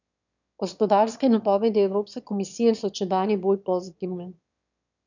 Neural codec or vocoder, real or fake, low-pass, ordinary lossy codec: autoencoder, 22.05 kHz, a latent of 192 numbers a frame, VITS, trained on one speaker; fake; 7.2 kHz; none